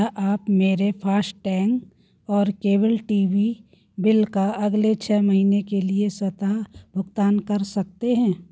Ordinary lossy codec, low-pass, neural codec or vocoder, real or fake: none; none; none; real